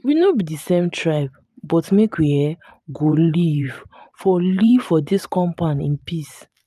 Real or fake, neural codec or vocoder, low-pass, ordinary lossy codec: fake; vocoder, 44.1 kHz, 128 mel bands every 512 samples, BigVGAN v2; 14.4 kHz; none